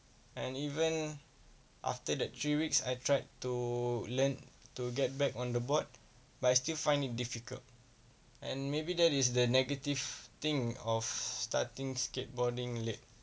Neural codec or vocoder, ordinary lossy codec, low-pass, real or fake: none; none; none; real